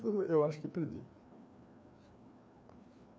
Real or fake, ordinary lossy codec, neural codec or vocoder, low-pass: fake; none; codec, 16 kHz, 2 kbps, FreqCodec, larger model; none